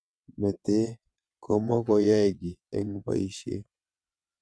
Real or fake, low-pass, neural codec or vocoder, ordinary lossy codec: fake; none; vocoder, 22.05 kHz, 80 mel bands, WaveNeXt; none